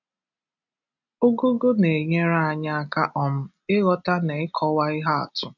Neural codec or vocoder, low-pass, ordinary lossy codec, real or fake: none; 7.2 kHz; none; real